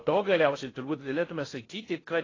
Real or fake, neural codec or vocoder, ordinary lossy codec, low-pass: fake; codec, 16 kHz in and 24 kHz out, 0.6 kbps, FocalCodec, streaming, 2048 codes; AAC, 32 kbps; 7.2 kHz